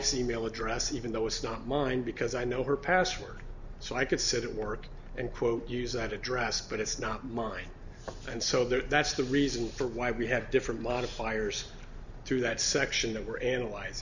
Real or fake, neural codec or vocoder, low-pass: real; none; 7.2 kHz